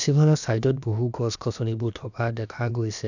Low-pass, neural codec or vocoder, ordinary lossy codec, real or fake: 7.2 kHz; codec, 16 kHz, about 1 kbps, DyCAST, with the encoder's durations; none; fake